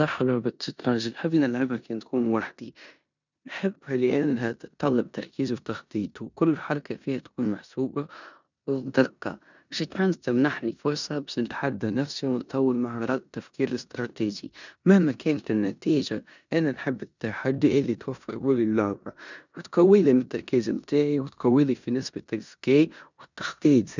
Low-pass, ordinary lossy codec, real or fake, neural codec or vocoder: 7.2 kHz; none; fake; codec, 16 kHz in and 24 kHz out, 0.9 kbps, LongCat-Audio-Codec, four codebook decoder